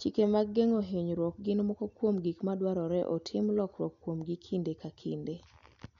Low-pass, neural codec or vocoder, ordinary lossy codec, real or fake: 7.2 kHz; none; none; real